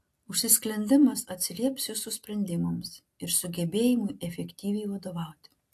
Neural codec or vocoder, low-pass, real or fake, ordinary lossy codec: none; 14.4 kHz; real; AAC, 64 kbps